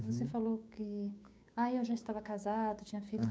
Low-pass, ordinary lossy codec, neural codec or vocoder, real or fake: none; none; codec, 16 kHz, 6 kbps, DAC; fake